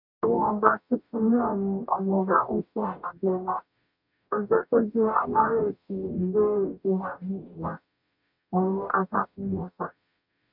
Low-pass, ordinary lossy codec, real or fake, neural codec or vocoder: 5.4 kHz; none; fake; codec, 44.1 kHz, 0.9 kbps, DAC